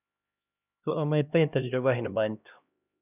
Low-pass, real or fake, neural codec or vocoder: 3.6 kHz; fake; codec, 16 kHz, 1 kbps, X-Codec, HuBERT features, trained on LibriSpeech